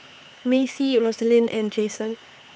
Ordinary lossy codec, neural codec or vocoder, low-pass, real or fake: none; codec, 16 kHz, 4 kbps, X-Codec, HuBERT features, trained on LibriSpeech; none; fake